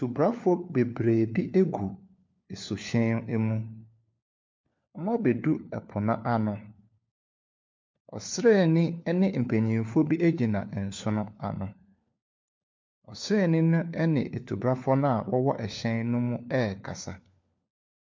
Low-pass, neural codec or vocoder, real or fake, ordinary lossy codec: 7.2 kHz; codec, 16 kHz, 4 kbps, FunCodec, trained on LibriTTS, 50 frames a second; fake; MP3, 48 kbps